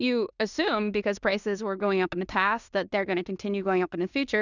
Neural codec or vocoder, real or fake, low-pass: codec, 16 kHz in and 24 kHz out, 0.9 kbps, LongCat-Audio-Codec, fine tuned four codebook decoder; fake; 7.2 kHz